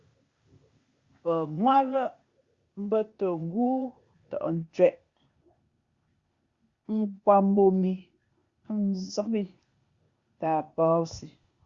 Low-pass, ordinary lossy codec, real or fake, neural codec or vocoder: 7.2 kHz; Opus, 64 kbps; fake; codec, 16 kHz, 0.8 kbps, ZipCodec